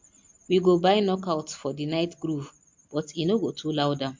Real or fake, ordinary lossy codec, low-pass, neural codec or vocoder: real; MP3, 48 kbps; 7.2 kHz; none